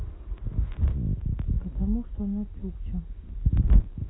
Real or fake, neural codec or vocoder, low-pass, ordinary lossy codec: real; none; 7.2 kHz; AAC, 16 kbps